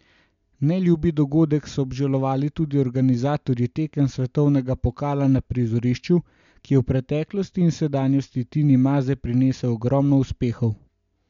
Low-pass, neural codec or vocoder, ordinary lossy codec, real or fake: 7.2 kHz; none; MP3, 64 kbps; real